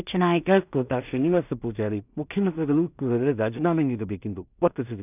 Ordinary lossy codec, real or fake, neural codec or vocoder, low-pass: none; fake; codec, 16 kHz in and 24 kHz out, 0.4 kbps, LongCat-Audio-Codec, two codebook decoder; 3.6 kHz